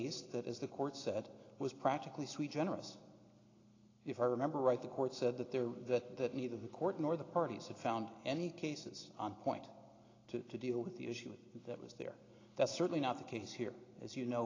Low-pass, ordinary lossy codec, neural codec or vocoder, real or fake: 7.2 kHz; AAC, 32 kbps; none; real